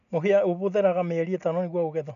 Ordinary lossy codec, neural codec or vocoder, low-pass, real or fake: none; none; 7.2 kHz; real